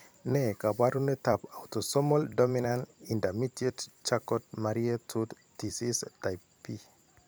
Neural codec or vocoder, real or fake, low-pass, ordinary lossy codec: vocoder, 44.1 kHz, 128 mel bands every 256 samples, BigVGAN v2; fake; none; none